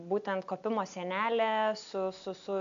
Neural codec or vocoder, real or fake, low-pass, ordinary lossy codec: none; real; 7.2 kHz; AAC, 64 kbps